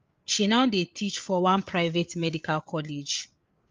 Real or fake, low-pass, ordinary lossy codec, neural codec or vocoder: fake; 7.2 kHz; Opus, 24 kbps; codec, 16 kHz, 8 kbps, FreqCodec, larger model